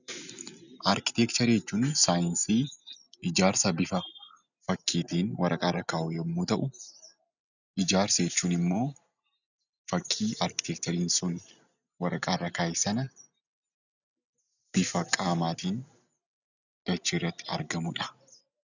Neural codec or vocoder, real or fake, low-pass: none; real; 7.2 kHz